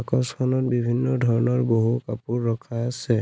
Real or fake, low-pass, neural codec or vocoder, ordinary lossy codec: real; none; none; none